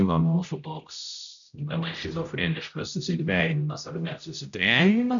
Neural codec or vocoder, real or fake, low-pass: codec, 16 kHz, 0.5 kbps, X-Codec, HuBERT features, trained on general audio; fake; 7.2 kHz